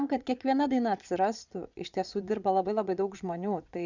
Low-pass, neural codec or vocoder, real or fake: 7.2 kHz; vocoder, 22.05 kHz, 80 mel bands, Vocos; fake